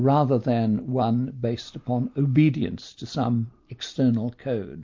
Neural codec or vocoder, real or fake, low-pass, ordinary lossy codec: none; real; 7.2 kHz; MP3, 48 kbps